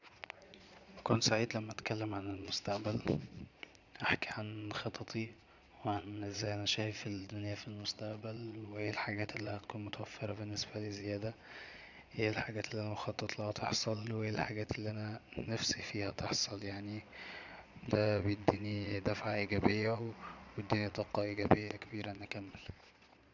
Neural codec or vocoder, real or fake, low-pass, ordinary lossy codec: vocoder, 22.05 kHz, 80 mel bands, Vocos; fake; 7.2 kHz; none